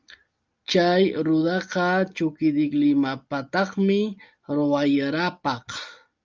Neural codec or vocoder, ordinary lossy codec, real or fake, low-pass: none; Opus, 24 kbps; real; 7.2 kHz